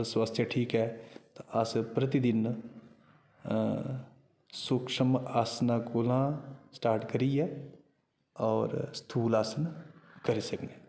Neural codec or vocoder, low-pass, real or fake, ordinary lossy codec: none; none; real; none